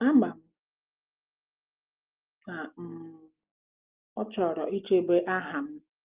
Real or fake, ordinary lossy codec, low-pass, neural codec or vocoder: real; Opus, 32 kbps; 3.6 kHz; none